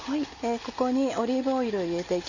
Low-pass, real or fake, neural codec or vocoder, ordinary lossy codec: 7.2 kHz; real; none; Opus, 64 kbps